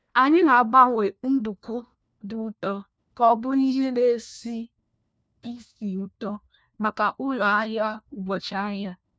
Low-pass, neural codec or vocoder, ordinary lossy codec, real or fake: none; codec, 16 kHz, 1 kbps, FunCodec, trained on LibriTTS, 50 frames a second; none; fake